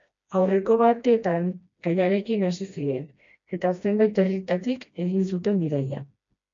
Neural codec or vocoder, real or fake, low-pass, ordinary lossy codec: codec, 16 kHz, 1 kbps, FreqCodec, smaller model; fake; 7.2 kHz; MP3, 48 kbps